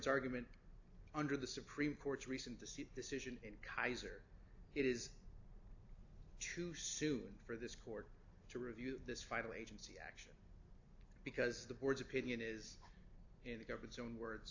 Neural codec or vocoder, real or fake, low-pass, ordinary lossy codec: none; real; 7.2 kHz; AAC, 48 kbps